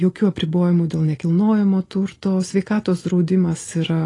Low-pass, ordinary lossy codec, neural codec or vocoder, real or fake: 10.8 kHz; AAC, 32 kbps; none; real